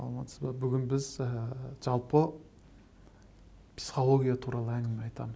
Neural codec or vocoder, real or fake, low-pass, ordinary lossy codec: none; real; none; none